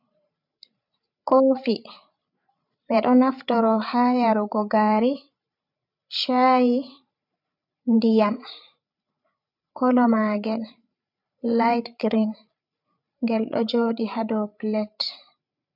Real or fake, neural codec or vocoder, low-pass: fake; codec, 16 kHz, 8 kbps, FreqCodec, larger model; 5.4 kHz